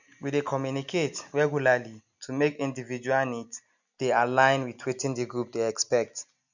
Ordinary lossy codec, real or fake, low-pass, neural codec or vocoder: none; real; 7.2 kHz; none